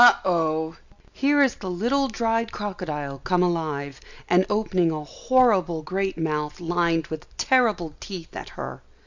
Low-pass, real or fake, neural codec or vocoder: 7.2 kHz; real; none